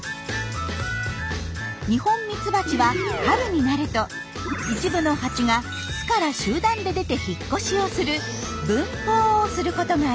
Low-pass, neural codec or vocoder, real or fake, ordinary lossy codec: none; none; real; none